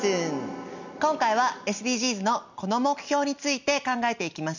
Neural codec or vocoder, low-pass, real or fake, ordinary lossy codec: none; 7.2 kHz; real; none